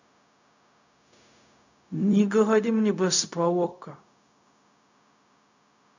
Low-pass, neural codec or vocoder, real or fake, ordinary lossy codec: 7.2 kHz; codec, 16 kHz, 0.4 kbps, LongCat-Audio-Codec; fake; none